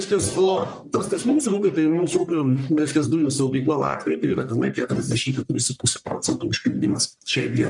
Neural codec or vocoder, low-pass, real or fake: codec, 44.1 kHz, 1.7 kbps, Pupu-Codec; 10.8 kHz; fake